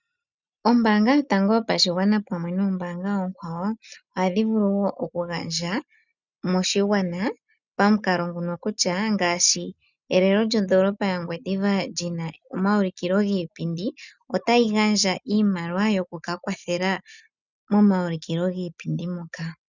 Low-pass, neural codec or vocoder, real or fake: 7.2 kHz; none; real